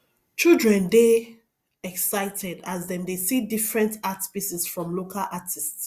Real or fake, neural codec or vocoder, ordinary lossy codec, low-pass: real; none; Opus, 64 kbps; 14.4 kHz